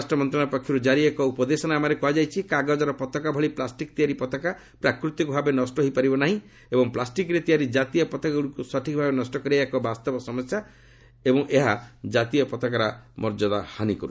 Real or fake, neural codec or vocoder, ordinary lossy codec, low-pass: real; none; none; none